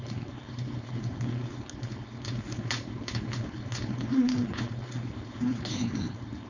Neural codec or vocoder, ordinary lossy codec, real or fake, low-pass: codec, 16 kHz, 4.8 kbps, FACodec; none; fake; 7.2 kHz